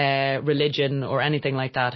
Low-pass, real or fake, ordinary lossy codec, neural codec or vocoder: 7.2 kHz; real; MP3, 24 kbps; none